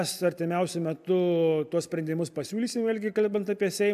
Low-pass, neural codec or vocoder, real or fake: 14.4 kHz; none; real